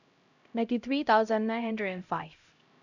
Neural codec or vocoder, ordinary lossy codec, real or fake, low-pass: codec, 16 kHz, 0.5 kbps, X-Codec, HuBERT features, trained on LibriSpeech; none; fake; 7.2 kHz